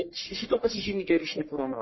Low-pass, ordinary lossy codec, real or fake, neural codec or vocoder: 7.2 kHz; MP3, 24 kbps; fake; codec, 44.1 kHz, 1.7 kbps, Pupu-Codec